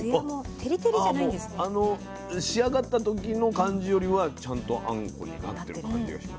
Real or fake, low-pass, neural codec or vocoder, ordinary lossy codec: real; none; none; none